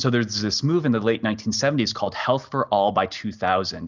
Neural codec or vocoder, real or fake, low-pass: none; real; 7.2 kHz